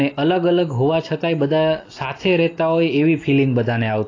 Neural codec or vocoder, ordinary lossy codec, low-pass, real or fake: none; AAC, 32 kbps; 7.2 kHz; real